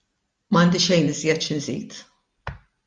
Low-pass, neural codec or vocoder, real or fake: 9.9 kHz; none; real